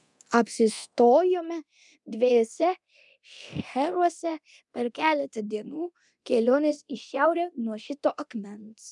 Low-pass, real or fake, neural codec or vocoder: 10.8 kHz; fake; codec, 24 kHz, 0.9 kbps, DualCodec